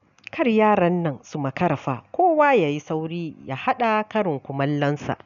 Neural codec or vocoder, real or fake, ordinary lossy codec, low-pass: none; real; none; 7.2 kHz